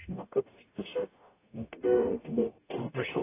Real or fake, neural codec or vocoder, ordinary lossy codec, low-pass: fake; codec, 44.1 kHz, 0.9 kbps, DAC; AAC, 16 kbps; 3.6 kHz